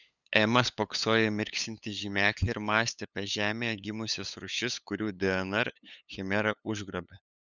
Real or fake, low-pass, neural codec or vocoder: fake; 7.2 kHz; codec, 16 kHz, 8 kbps, FunCodec, trained on LibriTTS, 25 frames a second